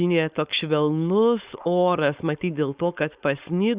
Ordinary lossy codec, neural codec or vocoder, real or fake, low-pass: Opus, 64 kbps; codec, 16 kHz, 4.8 kbps, FACodec; fake; 3.6 kHz